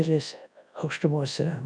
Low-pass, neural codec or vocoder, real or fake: 9.9 kHz; codec, 24 kHz, 0.9 kbps, WavTokenizer, large speech release; fake